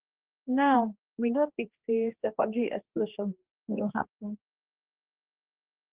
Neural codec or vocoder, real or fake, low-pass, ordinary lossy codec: codec, 16 kHz, 2 kbps, X-Codec, HuBERT features, trained on general audio; fake; 3.6 kHz; Opus, 16 kbps